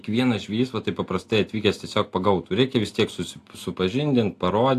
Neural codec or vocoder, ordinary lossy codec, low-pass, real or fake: none; AAC, 64 kbps; 14.4 kHz; real